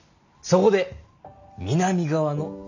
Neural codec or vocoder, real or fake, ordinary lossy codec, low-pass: none; real; none; 7.2 kHz